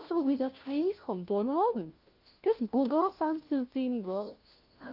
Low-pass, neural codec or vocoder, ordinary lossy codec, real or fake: 5.4 kHz; codec, 16 kHz, 1 kbps, FunCodec, trained on LibriTTS, 50 frames a second; Opus, 32 kbps; fake